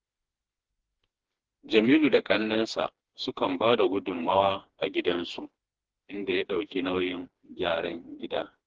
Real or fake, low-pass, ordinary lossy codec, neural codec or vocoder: fake; 7.2 kHz; Opus, 16 kbps; codec, 16 kHz, 2 kbps, FreqCodec, smaller model